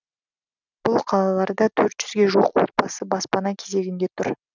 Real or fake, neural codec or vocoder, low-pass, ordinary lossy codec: real; none; 7.2 kHz; none